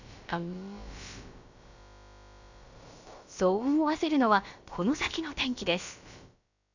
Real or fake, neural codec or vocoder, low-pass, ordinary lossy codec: fake; codec, 16 kHz, about 1 kbps, DyCAST, with the encoder's durations; 7.2 kHz; none